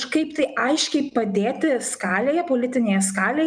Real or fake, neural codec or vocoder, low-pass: real; none; 9.9 kHz